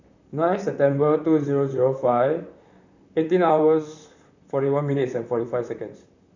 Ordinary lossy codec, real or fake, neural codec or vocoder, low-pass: none; fake; vocoder, 44.1 kHz, 128 mel bands, Pupu-Vocoder; 7.2 kHz